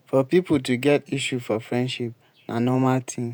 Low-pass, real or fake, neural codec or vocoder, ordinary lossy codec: none; fake; vocoder, 48 kHz, 128 mel bands, Vocos; none